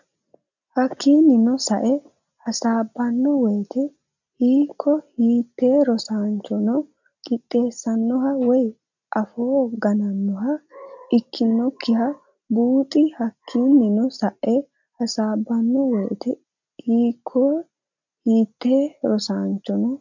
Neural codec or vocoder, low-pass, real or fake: none; 7.2 kHz; real